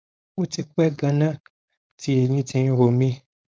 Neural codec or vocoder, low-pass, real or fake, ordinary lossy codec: codec, 16 kHz, 4.8 kbps, FACodec; none; fake; none